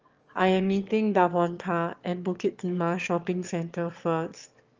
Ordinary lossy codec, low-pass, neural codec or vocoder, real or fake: Opus, 24 kbps; 7.2 kHz; autoencoder, 22.05 kHz, a latent of 192 numbers a frame, VITS, trained on one speaker; fake